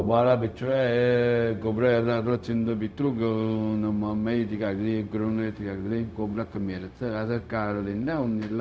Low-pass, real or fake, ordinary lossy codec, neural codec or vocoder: none; fake; none; codec, 16 kHz, 0.4 kbps, LongCat-Audio-Codec